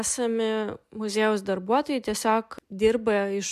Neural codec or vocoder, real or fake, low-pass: none; real; 14.4 kHz